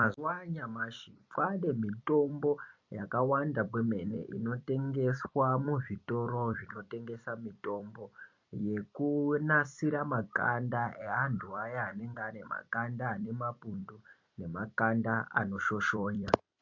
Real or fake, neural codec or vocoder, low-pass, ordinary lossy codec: fake; vocoder, 44.1 kHz, 128 mel bands every 256 samples, BigVGAN v2; 7.2 kHz; MP3, 48 kbps